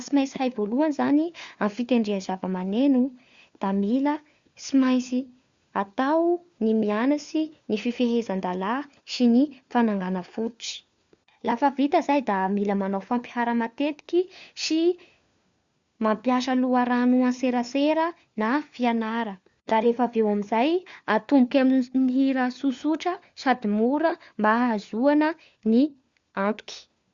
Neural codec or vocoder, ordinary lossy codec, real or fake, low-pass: codec, 16 kHz, 4 kbps, FunCodec, trained on LibriTTS, 50 frames a second; Opus, 64 kbps; fake; 7.2 kHz